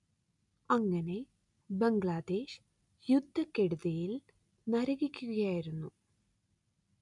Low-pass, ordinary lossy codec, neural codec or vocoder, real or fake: 10.8 kHz; none; none; real